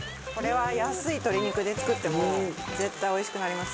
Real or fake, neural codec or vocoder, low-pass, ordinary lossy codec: real; none; none; none